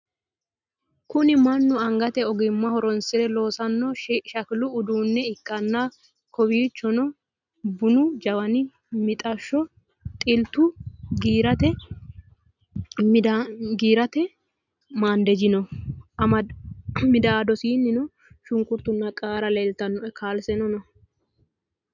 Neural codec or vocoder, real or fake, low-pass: none; real; 7.2 kHz